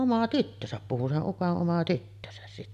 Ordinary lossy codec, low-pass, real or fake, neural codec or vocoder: none; 14.4 kHz; real; none